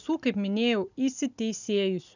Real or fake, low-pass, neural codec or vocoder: real; 7.2 kHz; none